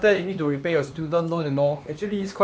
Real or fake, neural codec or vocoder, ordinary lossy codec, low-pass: fake; codec, 16 kHz, 2 kbps, X-Codec, HuBERT features, trained on LibriSpeech; none; none